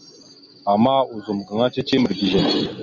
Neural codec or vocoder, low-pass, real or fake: none; 7.2 kHz; real